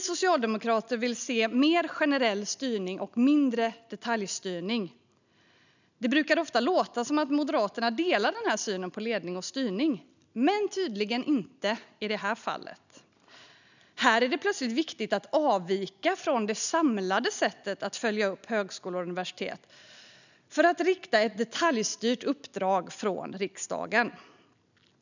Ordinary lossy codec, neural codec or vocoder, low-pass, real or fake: none; none; 7.2 kHz; real